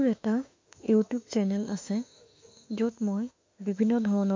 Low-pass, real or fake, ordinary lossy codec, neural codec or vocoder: 7.2 kHz; fake; MP3, 48 kbps; autoencoder, 48 kHz, 32 numbers a frame, DAC-VAE, trained on Japanese speech